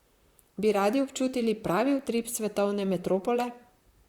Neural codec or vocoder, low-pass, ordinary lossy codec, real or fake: vocoder, 44.1 kHz, 128 mel bands, Pupu-Vocoder; 19.8 kHz; Opus, 64 kbps; fake